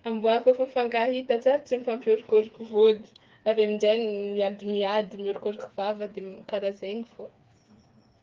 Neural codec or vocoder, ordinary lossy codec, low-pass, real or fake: codec, 16 kHz, 4 kbps, FreqCodec, smaller model; Opus, 32 kbps; 7.2 kHz; fake